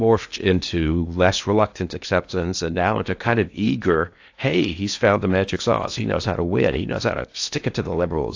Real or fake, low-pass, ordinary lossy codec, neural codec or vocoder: fake; 7.2 kHz; AAC, 48 kbps; codec, 16 kHz in and 24 kHz out, 0.8 kbps, FocalCodec, streaming, 65536 codes